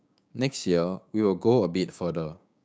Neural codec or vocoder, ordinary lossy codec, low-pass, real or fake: codec, 16 kHz, 6 kbps, DAC; none; none; fake